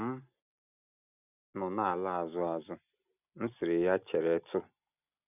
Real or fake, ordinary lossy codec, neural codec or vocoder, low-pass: real; none; none; 3.6 kHz